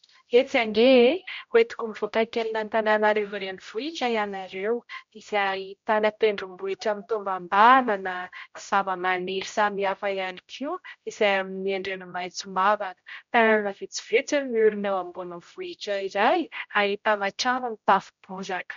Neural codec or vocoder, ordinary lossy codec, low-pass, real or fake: codec, 16 kHz, 0.5 kbps, X-Codec, HuBERT features, trained on general audio; MP3, 48 kbps; 7.2 kHz; fake